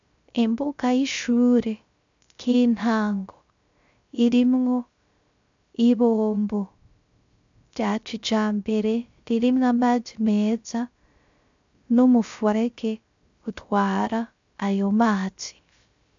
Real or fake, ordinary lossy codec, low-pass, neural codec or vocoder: fake; AAC, 64 kbps; 7.2 kHz; codec, 16 kHz, 0.3 kbps, FocalCodec